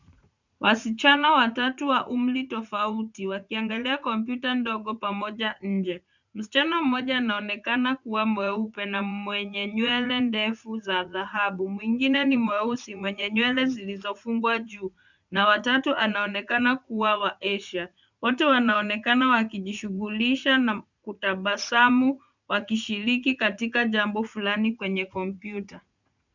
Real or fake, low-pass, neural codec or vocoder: fake; 7.2 kHz; vocoder, 44.1 kHz, 80 mel bands, Vocos